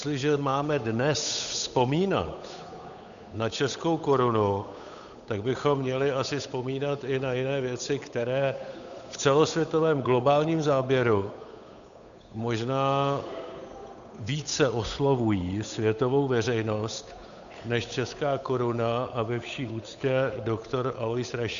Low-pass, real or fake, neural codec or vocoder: 7.2 kHz; fake; codec, 16 kHz, 8 kbps, FunCodec, trained on Chinese and English, 25 frames a second